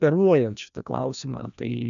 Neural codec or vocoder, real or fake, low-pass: codec, 16 kHz, 1 kbps, FreqCodec, larger model; fake; 7.2 kHz